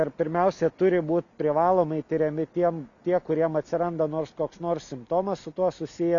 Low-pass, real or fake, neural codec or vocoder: 7.2 kHz; real; none